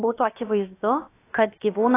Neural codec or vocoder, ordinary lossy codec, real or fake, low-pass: codec, 16 kHz, about 1 kbps, DyCAST, with the encoder's durations; AAC, 16 kbps; fake; 3.6 kHz